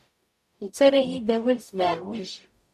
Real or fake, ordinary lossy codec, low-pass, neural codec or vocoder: fake; MP3, 96 kbps; 14.4 kHz; codec, 44.1 kHz, 0.9 kbps, DAC